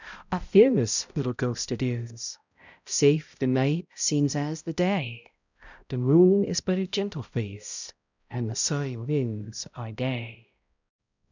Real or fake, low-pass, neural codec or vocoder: fake; 7.2 kHz; codec, 16 kHz, 0.5 kbps, X-Codec, HuBERT features, trained on balanced general audio